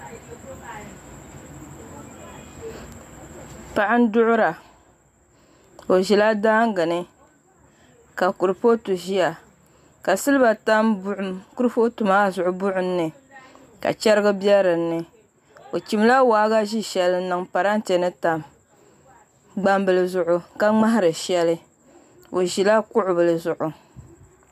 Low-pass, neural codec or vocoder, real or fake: 14.4 kHz; none; real